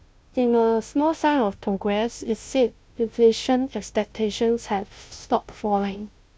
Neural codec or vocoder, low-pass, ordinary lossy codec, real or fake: codec, 16 kHz, 0.5 kbps, FunCodec, trained on Chinese and English, 25 frames a second; none; none; fake